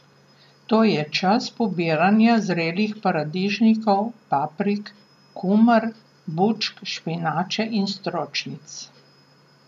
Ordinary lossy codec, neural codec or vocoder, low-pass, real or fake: none; none; 14.4 kHz; real